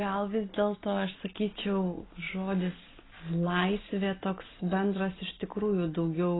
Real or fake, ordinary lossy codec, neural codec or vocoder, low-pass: real; AAC, 16 kbps; none; 7.2 kHz